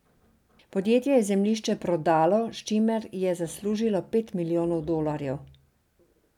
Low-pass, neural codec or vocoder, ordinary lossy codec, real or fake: 19.8 kHz; none; none; real